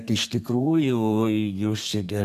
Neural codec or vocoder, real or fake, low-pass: codec, 32 kHz, 1.9 kbps, SNAC; fake; 14.4 kHz